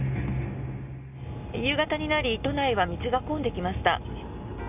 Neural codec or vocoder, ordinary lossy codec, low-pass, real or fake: none; none; 3.6 kHz; real